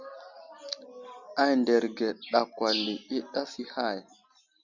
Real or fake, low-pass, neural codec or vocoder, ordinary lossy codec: real; 7.2 kHz; none; Opus, 64 kbps